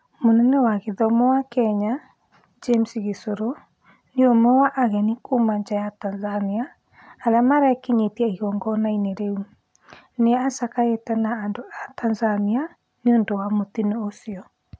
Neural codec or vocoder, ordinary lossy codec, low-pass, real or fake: none; none; none; real